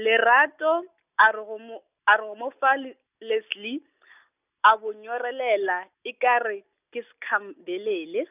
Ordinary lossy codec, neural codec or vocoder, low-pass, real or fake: none; none; 3.6 kHz; real